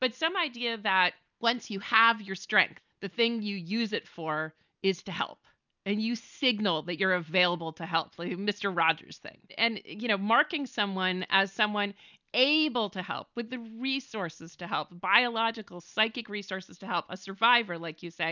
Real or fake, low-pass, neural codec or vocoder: real; 7.2 kHz; none